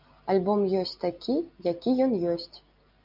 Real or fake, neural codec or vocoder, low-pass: real; none; 5.4 kHz